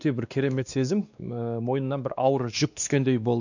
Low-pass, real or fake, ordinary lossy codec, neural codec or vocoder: 7.2 kHz; fake; none; codec, 16 kHz, 2 kbps, X-Codec, WavLM features, trained on Multilingual LibriSpeech